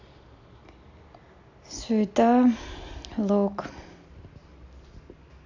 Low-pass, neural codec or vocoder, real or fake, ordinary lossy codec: 7.2 kHz; none; real; AAC, 48 kbps